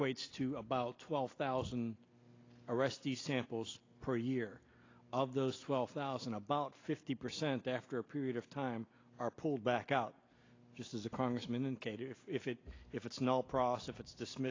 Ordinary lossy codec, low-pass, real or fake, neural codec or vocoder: AAC, 32 kbps; 7.2 kHz; real; none